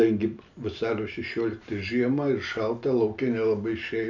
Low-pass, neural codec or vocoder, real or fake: 7.2 kHz; none; real